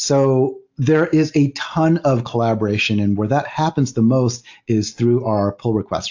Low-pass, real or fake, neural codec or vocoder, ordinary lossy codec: 7.2 kHz; real; none; AAC, 48 kbps